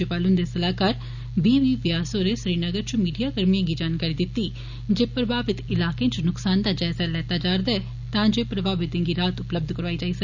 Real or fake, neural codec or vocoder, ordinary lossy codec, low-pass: real; none; none; 7.2 kHz